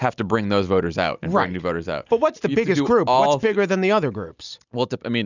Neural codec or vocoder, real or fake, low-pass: none; real; 7.2 kHz